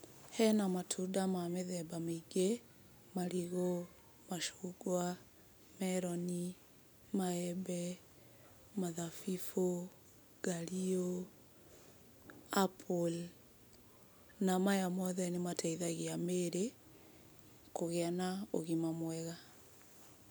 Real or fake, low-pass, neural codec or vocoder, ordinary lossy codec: real; none; none; none